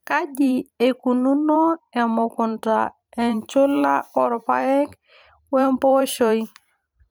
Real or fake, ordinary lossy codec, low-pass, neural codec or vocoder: fake; none; none; vocoder, 44.1 kHz, 128 mel bands every 512 samples, BigVGAN v2